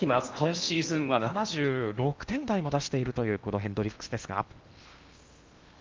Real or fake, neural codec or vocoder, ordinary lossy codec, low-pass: fake; codec, 16 kHz in and 24 kHz out, 0.8 kbps, FocalCodec, streaming, 65536 codes; Opus, 24 kbps; 7.2 kHz